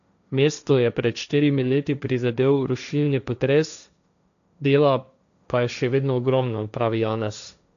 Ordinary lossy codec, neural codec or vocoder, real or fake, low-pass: none; codec, 16 kHz, 1.1 kbps, Voila-Tokenizer; fake; 7.2 kHz